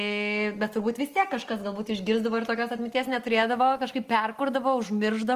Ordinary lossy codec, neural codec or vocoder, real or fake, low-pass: Opus, 24 kbps; none; real; 14.4 kHz